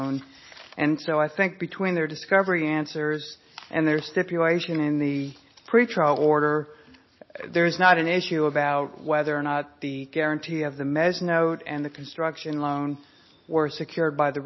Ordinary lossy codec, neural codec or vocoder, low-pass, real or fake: MP3, 24 kbps; none; 7.2 kHz; real